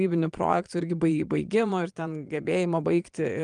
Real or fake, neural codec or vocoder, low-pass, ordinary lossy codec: fake; vocoder, 22.05 kHz, 80 mel bands, Vocos; 9.9 kHz; Opus, 32 kbps